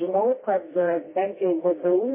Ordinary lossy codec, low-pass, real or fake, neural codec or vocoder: MP3, 16 kbps; 3.6 kHz; fake; codec, 16 kHz, 1 kbps, FreqCodec, smaller model